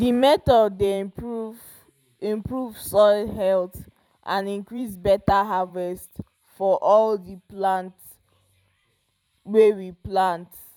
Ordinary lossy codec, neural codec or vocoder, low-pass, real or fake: none; vocoder, 44.1 kHz, 128 mel bands every 512 samples, BigVGAN v2; 19.8 kHz; fake